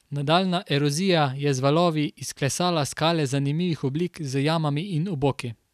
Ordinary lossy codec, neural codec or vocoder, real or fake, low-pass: none; none; real; 14.4 kHz